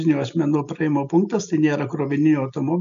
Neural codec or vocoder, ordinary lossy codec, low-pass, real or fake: none; MP3, 64 kbps; 7.2 kHz; real